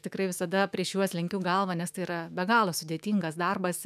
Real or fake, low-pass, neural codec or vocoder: fake; 14.4 kHz; autoencoder, 48 kHz, 128 numbers a frame, DAC-VAE, trained on Japanese speech